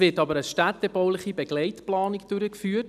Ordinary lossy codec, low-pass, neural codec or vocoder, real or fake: none; 14.4 kHz; none; real